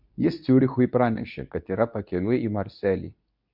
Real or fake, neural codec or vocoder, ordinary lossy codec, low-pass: fake; codec, 24 kHz, 0.9 kbps, WavTokenizer, medium speech release version 2; MP3, 48 kbps; 5.4 kHz